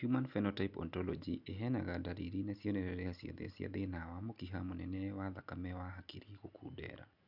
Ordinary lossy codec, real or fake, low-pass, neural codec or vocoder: none; real; 5.4 kHz; none